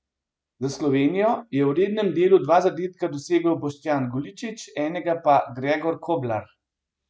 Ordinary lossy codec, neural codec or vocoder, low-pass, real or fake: none; none; none; real